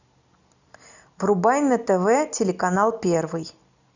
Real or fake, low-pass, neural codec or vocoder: real; 7.2 kHz; none